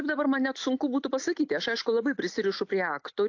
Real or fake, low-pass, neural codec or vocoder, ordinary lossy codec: real; 7.2 kHz; none; AAC, 48 kbps